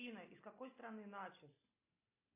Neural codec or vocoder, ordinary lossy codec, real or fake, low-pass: none; AAC, 24 kbps; real; 3.6 kHz